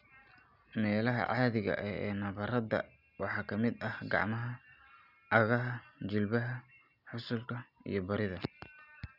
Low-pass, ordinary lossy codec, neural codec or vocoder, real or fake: 5.4 kHz; none; none; real